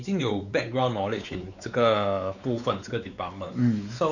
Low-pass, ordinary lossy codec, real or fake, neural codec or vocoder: 7.2 kHz; none; fake; codec, 16 kHz, 4 kbps, X-Codec, WavLM features, trained on Multilingual LibriSpeech